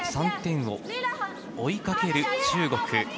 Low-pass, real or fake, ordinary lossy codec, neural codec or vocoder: none; real; none; none